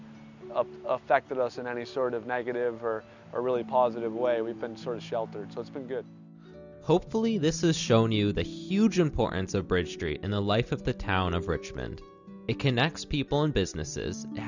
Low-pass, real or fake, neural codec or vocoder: 7.2 kHz; real; none